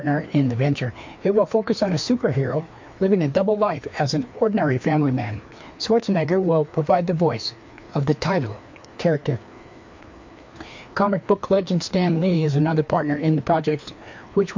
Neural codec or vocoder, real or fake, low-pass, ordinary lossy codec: codec, 16 kHz, 2 kbps, FreqCodec, larger model; fake; 7.2 kHz; MP3, 48 kbps